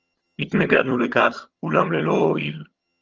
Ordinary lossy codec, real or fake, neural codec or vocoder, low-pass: Opus, 24 kbps; fake; vocoder, 22.05 kHz, 80 mel bands, HiFi-GAN; 7.2 kHz